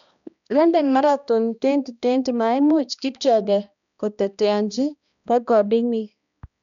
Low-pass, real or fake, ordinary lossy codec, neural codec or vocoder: 7.2 kHz; fake; none; codec, 16 kHz, 1 kbps, X-Codec, HuBERT features, trained on balanced general audio